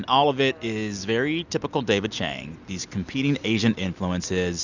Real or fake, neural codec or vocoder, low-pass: real; none; 7.2 kHz